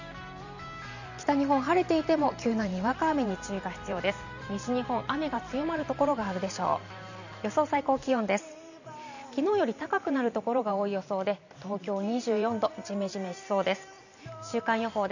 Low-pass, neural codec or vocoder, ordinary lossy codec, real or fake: 7.2 kHz; vocoder, 44.1 kHz, 128 mel bands every 512 samples, BigVGAN v2; none; fake